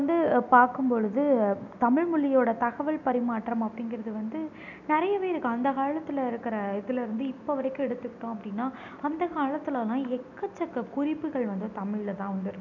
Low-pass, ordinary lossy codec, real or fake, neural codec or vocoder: 7.2 kHz; none; real; none